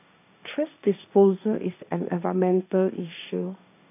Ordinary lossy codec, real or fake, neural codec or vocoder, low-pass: none; fake; codec, 16 kHz, 1.1 kbps, Voila-Tokenizer; 3.6 kHz